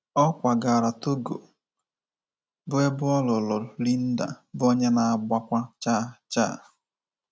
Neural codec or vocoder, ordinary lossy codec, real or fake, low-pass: none; none; real; none